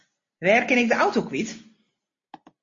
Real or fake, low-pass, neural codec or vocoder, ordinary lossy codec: real; 7.2 kHz; none; MP3, 32 kbps